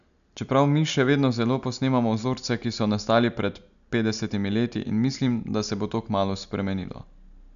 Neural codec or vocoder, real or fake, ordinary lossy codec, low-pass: none; real; none; 7.2 kHz